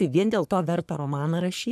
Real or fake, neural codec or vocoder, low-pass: fake; codec, 44.1 kHz, 3.4 kbps, Pupu-Codec; 14.4 kHz